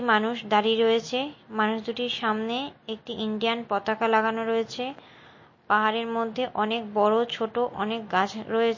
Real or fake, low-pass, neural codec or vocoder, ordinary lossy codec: real; 7.2 kHz; none; MP3, 32 kbps